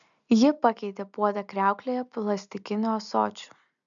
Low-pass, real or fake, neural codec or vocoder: 7.2 kHz; real; none